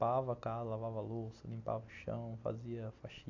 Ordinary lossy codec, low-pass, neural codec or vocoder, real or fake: AAC, 48 kbps; 7.2 kHz; none; real